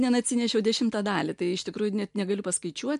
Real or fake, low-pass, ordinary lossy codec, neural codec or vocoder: real; 10.8 kHz; MP3, 64 kbps; none